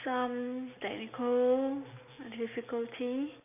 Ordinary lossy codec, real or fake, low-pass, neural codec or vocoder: none; real; 3.6 kHz; none